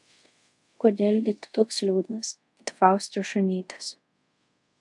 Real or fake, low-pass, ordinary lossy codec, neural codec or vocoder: fake; 10.8 kHz; AAC, 64 kbps; codec, 24 kHz, 0.5 kbps, DualCodec